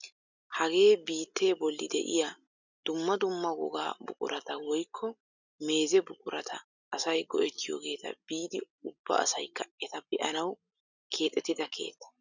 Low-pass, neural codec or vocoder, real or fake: 7.2 kHz; none; real